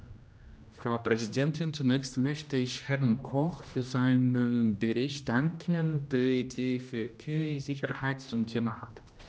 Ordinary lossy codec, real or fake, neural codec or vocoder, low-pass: none; fake; codec, 16 kHz, 1 kbps, X-Codec, HuBERT features, trained on general audio; none